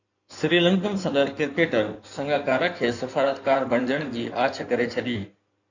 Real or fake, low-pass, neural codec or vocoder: fake; 7.2 kHz; codec, 16 kHz in and 24 kHz out, 2.2 kbps, FireRedTTS-2 codec